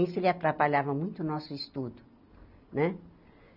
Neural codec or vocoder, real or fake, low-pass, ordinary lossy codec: none; real; 5.4 kHz; none